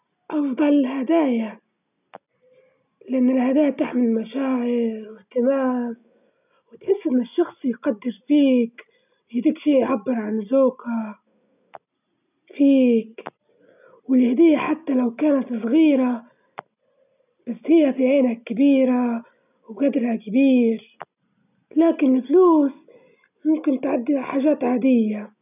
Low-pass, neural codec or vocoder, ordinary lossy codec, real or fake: 3.6 kHz; none; none; real